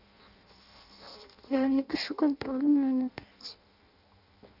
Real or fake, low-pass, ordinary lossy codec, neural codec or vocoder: fake; 5.4 kHz; none; codec, 16 kHz in and 24 kHz out, 0.6 kbps, FireRedTTS-2 codec